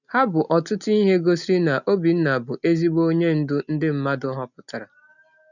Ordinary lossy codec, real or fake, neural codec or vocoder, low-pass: none; real; none; 7.2 kHz